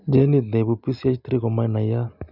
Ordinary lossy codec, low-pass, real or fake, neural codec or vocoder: none; 5.4 kHz; real; none